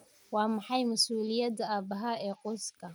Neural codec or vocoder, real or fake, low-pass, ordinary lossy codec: none; real; none; none